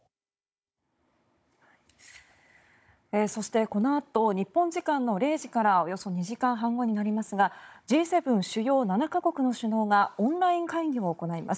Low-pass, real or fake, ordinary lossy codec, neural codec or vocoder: none; fake; none; codec, 16 kHz, 16 kbps, FunCodec, trained on Chinese and English, 50 frames a second